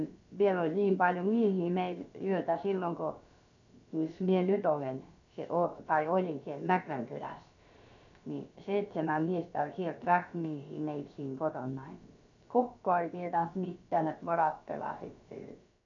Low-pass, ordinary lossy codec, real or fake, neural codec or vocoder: 7.2 kHz; none; fake; codec, 16 kHz, about 1 kbps, DyCAST, with the encoder's durations